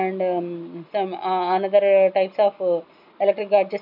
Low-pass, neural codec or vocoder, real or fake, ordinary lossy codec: 5.4 kHz; none; real; none